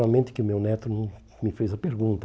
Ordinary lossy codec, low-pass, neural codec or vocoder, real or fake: none; none; none; real